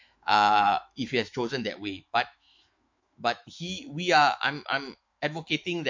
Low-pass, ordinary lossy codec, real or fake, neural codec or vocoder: 7.2 kHz; MP3, 48 kbps; fake; autoencoder, 48 kHz, 128 numbers a frame, DAC-VAE, trained on Japanese speech